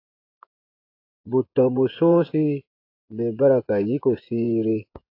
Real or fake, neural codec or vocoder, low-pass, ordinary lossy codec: fake; vocoder, 24 kHz, 100 mel bands, Vocos; 5.4 kHz; AAC, 32 kbps